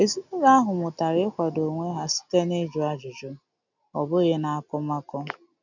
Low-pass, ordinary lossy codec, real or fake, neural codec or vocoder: 7.2 kHz; none; real; none